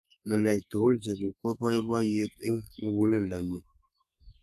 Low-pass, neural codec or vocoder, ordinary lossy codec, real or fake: 14.4 kHz; codec, 32 kHz, 1.9 kbps, SNAC; AAC, 96 kbps; fake